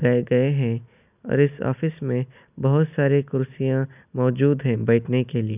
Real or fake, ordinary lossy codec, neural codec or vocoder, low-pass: real; none; none; 3.6 kHz